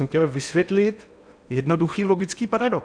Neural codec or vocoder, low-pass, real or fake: codec, 16 kHz in and 24 kHz out, 0.8 kbps, FocalCodec, streaming, 65536 codes; 9.9 kHz; fake